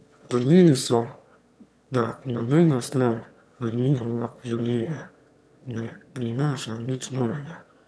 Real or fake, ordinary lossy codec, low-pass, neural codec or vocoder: fake; none; none; autoencoder, 22.05 kHz, a latent of 192 numbers a frame, VITS, trained on one speaker